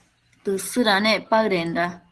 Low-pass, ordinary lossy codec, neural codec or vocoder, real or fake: 10.8 kHz; Opus, 16 kbps; none; real